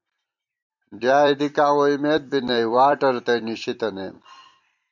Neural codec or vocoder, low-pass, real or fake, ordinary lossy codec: vocoder, 44.1 kHz, 128 mel bands every 256 samples, BigVGAN v2; 7.2 kHz; fake; MP3, 64 kbps